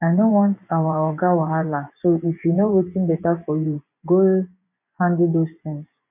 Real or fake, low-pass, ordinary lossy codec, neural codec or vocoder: fake; 3.6 kHz; none; vocoder, 24 kHz, 100 mel bands, Vocos